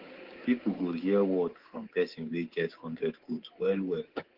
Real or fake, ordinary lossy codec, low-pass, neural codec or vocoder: fake; Opus, 16 kbps; 5.4 kHz; codec, 24 kHz, 3.1 kbps, DualCodec